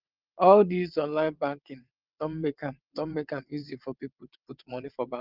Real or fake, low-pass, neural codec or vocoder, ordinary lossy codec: fake; 5.4 kHz; vocoder, 22.05 kHz, 80 mel bands, WaveNeXt; Opus, 32 kbps